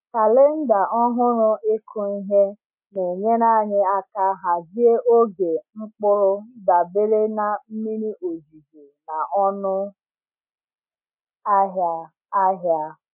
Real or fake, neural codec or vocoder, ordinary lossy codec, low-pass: real; none; MP3, 32 kbps; 3.6 kHz